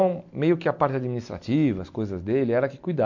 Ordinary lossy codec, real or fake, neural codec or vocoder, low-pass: MP3, 48 kbps; real; none; 7.2 kHz